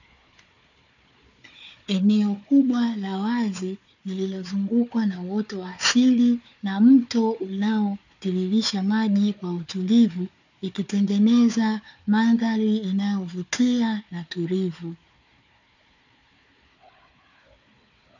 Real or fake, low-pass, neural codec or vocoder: fake; 7.2 kHz; codec, 16 kHz, 4 kbps, FunCodec, trained on Chinese and English, 50 frames a second